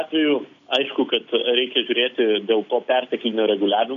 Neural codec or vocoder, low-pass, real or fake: none; 7.2 kHz; real